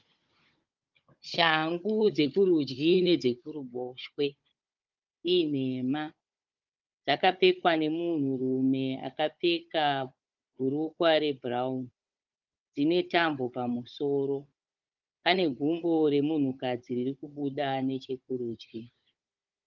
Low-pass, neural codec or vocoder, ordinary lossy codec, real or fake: 7.2 kHz; codec, 16 kHz, 16 kbps, FunCodec, trained on Chinese and English, 50 frames a second; Opus, 24 kbps; fake